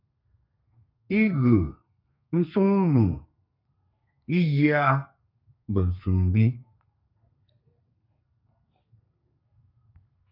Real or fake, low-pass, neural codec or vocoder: fake; 5.4 kHz; codec, 32 kHz, 1.9 kbps, SNAC